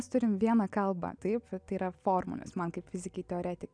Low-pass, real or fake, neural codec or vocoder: 9.9 kHz; real; none